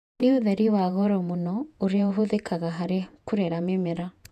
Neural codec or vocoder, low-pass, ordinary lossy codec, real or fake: vocoder, 48 kHz, 128 mel bands, Vocos; 14.4 kHz; none; fake